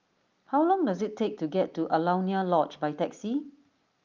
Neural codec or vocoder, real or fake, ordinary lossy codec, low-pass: none; real; Opus, 24 kbps; 7.2 kHz